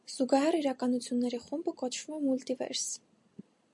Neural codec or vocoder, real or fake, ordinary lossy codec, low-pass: none; real; MP3, 64 kbps; 10.8 kHz